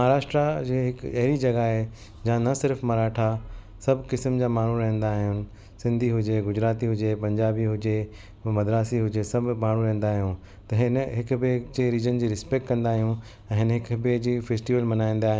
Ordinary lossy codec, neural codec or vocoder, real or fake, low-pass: none; none; real; none